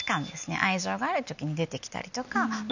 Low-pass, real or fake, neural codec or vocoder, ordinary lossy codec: 7.2 kHz; real; none; none